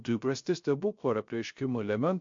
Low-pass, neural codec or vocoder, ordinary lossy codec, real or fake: 7.2 kHz; codec, 16 kHz, 0.3 kbps, FocalCodec; MP3, 48 kbps; fake